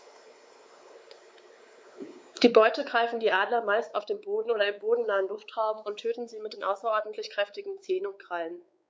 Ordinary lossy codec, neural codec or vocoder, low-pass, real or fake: none; codec, 16 kHz, 4 kbps, X-Codec, WavLM features, trained on Multilingual LibriSpeech; none; fake